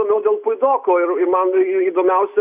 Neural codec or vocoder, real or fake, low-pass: none; real; 3.6 kHz